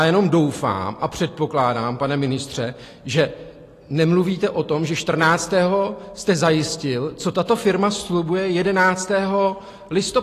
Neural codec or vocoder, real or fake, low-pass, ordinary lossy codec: none; real; 14.4 kHz; AAC, 48 kbps